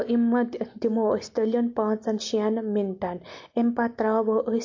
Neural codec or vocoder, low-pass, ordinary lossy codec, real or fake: none; 7.2 kHz; MP3, 48 kbps; real